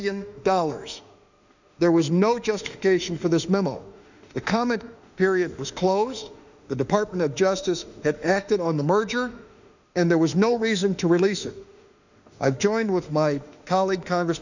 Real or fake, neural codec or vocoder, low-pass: fake; autoencoder, 48 kHz, 32 numbers a frame, DAC-VAE, trained on Japanese speech; 7.2 kHz